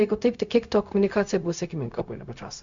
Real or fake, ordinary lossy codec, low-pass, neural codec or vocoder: fake; MP3, 64 kbps; 7.2 kHz; codec, 16 kHz, 0.4 kbps, LongCat-Audio-Codec